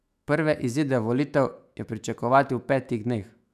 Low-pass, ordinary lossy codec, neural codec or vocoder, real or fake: 14.4 kHz; none; autoencoder, 48 kHz, 128 numbers a frame, DAC-VAE, trained on Japanese speech; fake